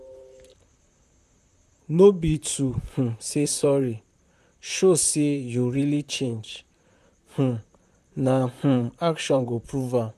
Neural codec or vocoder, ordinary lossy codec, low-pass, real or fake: vocoder, 44.1 kHz, 128 mel bands, Pupu-Vocoder; none; 14.4 kHz; fake